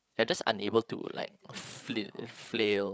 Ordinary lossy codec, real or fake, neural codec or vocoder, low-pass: none; fake; codec, 16 kHz, 8 kbps, FreqCodec, larger model; none